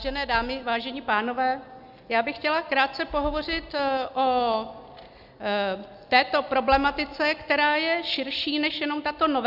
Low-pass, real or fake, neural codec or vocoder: 5.4 kHz; real; none